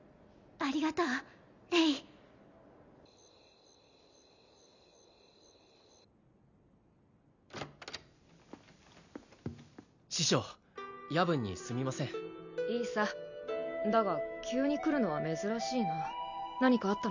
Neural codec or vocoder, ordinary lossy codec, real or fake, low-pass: none; none; real; 7.2 kHz